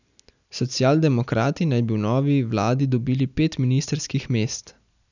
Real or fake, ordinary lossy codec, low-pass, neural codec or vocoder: real; none; 7.2 kHz; none